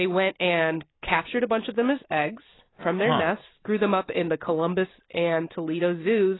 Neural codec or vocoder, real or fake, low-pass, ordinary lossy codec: none; real; 7.2 kHz; AAC, 16 kbps